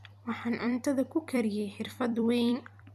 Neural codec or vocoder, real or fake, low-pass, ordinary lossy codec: vocoder, 44.1 kHz, 128 mel bands every 256 samples, BigVGAN v2; fake; 14.4 kHz; none